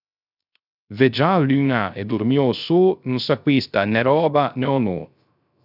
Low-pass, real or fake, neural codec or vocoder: 5.4 kHz; fake; codec, 16 kHz, 0.3 kbps, FocalCodec